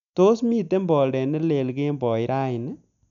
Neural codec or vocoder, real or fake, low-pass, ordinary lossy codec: none; real; 7.2 kHz; none